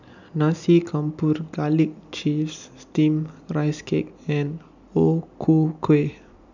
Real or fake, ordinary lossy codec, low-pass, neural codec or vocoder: real; none; 7.2 kHz; none